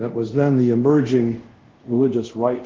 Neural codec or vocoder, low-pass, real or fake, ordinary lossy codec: codec, 24 kHz, 0.5 kbps, DualCodec; 7.2 kHz; fake; Opus, 16 kbps